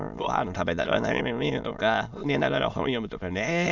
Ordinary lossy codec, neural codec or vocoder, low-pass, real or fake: none; autoencoder, 22.05 kHz, a latent of 192 numbers a frame, VITS, trained on many speakers; 7.2 kHz; fake